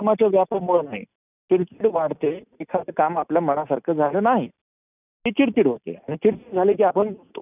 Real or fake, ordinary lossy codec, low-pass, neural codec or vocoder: real; none; 3.6 kHz; none